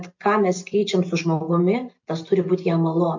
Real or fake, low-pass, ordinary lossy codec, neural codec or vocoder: real; 7.2 kHz; MP3, 48 kbps; none